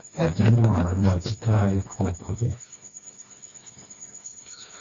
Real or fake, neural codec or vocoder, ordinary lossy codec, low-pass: fake; codec, 16 kHz, 2 kbps, FreqCodec, smaller model; AAC, 32 kbps; 7.2 kHz